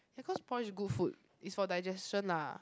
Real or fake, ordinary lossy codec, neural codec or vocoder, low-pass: real; none; none; none